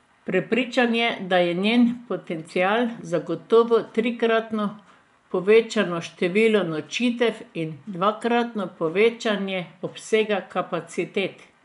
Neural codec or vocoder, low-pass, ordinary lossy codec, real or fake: vocoder, 24 kHz, 100 mel bands, Vocos; 10.8 kHz; none; fake